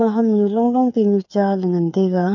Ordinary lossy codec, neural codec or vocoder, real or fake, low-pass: none; codec, 16 kHz, 8 kbps, FreqCodec, smaller model; fake; 7.2 kHz